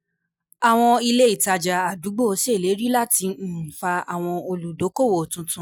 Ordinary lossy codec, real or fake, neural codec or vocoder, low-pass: none; real; none; none